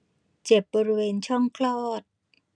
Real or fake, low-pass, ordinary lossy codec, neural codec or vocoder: real; 9.9 kHz; none; none